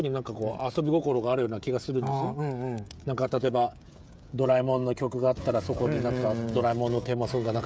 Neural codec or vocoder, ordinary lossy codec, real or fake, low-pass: codec, 16 kHz, 16 kbps, FreqCodec, smaller model; none; fake; none